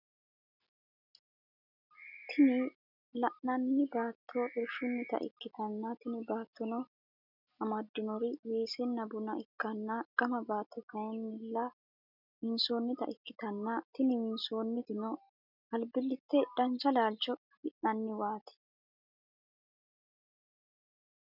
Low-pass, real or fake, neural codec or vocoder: 5.4 kHz; real; none